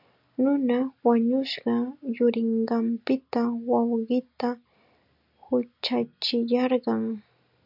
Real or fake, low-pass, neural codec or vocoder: real; 5.4 kHz; none